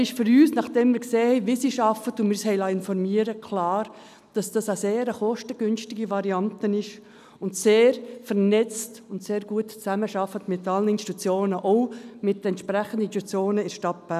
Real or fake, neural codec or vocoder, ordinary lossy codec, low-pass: real; none; none; 14.4 kHz